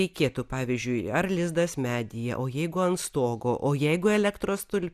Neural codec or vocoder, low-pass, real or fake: none; 14.4 kHz; real